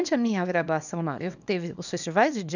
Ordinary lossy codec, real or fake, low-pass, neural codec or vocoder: none; fake; 7.2 kHz; codec, 24 kHz, 0.9 kbps, WavTokenizer, small release